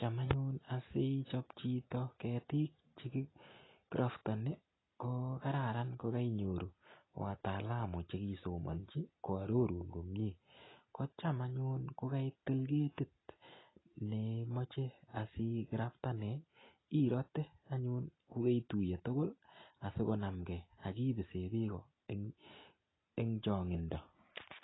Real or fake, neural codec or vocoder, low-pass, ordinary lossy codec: real; none; 7.2 kHz; AAC, 16 kbps